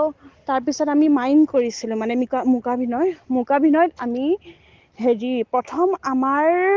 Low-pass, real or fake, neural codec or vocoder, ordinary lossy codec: 7.2 kHz; real; none; Opus, 16 kbps